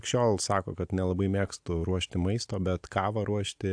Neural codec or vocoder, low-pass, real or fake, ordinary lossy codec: none; 9.9 kHz; real; AAC, 64 kbps